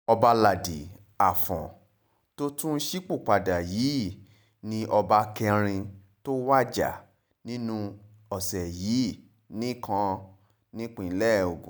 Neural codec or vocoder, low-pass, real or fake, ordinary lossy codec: none; none; real; none